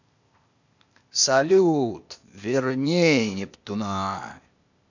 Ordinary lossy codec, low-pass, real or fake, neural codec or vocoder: none; 7.2 kHz; fake; codec, 16 kHz, 0.8 kbps, ZipCodec